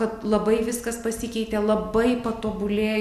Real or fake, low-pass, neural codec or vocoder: real; 14.4 kHz; none